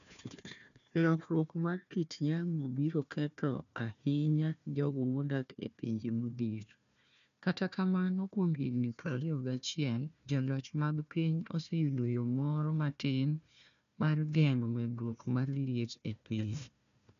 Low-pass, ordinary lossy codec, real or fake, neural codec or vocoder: 7.2 kHz; none; fake; codec, 16 kHz, 1 kbps, FunCodec, trained on Chinese and English, 50 frames a second